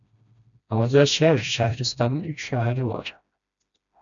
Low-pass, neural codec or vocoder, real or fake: 7.2 kHz; codec, 16 kHz, 1 kbps, FreqCodec, smaller model; fake